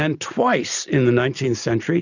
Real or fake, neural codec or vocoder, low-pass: real; none; 7.2 kHz